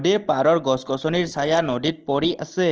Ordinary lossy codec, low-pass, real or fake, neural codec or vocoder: Opus, 16 kbps; 7.2 kHz; real; none